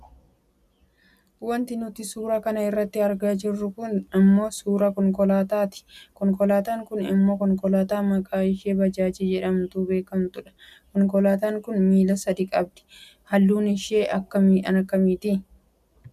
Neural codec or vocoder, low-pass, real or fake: none; 14.4 kHz; real